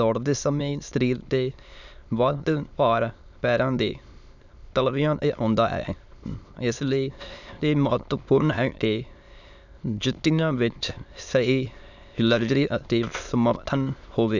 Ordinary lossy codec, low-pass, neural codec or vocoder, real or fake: none; 7.2 kHz; autoencoder, 22.05 kHz, a latent of 192 numbers a frame, VITS, trained on many speakers; fake